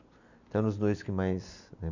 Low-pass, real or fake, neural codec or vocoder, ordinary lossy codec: 7.2 kHz; real; none; none